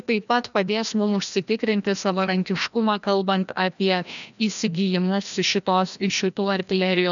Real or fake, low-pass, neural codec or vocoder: fake; 7.2 kHz; codec, 16 kHz, 1 kbps, FreqCodec, larger model